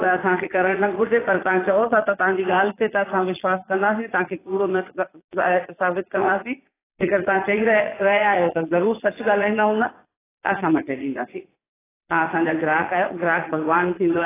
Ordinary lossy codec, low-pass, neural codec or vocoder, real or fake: AAC, 16 kbps; 3.6 kHz; vocoder, 22.05 kHz, 80 mel bands, Vocos; fake